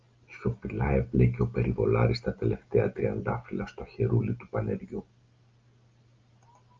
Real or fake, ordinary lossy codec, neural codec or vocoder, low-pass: real; Opus, 24 kbps; none; 7.2 kHz